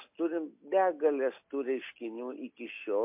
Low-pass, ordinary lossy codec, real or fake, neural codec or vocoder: 3.6 kHz; MP3, 32 kbps; real; none